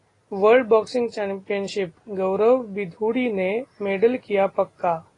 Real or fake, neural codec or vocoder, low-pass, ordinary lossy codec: real; none; 10.8 kHz; AAC, 32 kbps